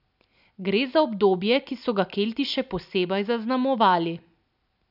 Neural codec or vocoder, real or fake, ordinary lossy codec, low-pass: none; real; none; 5.4 kHz